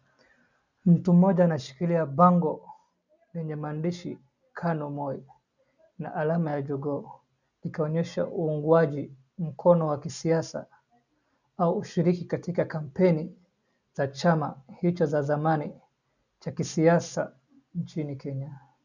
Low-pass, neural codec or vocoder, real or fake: 7.2 kHz; none; real